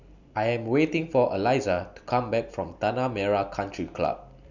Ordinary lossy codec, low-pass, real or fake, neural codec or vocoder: none; 7.2 kHz; real; none